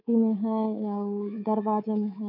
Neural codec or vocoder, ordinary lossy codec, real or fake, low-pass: codec, 24 kHz, 3.1 kbps, DualCodec; none; fake; 5.4 kHz